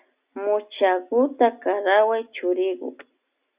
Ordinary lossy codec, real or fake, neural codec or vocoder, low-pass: Opus, 64 kbps; real; none; 3.6 kHz